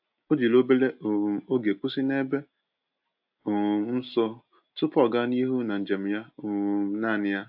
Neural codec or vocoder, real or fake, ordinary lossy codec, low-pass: none; real; AAC, 48 kbps; 5.4 kHz